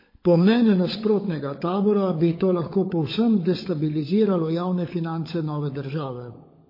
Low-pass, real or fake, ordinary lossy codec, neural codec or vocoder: 5.4 kHz; fake; MP3, 24 kbps; codec, 16 kHz, 16 kbps, FunCodec, trained on LibriTTS, 50 frames a second